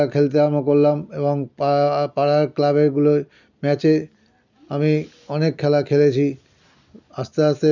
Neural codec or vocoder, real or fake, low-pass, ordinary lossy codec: none; real; 7.2 kHz; none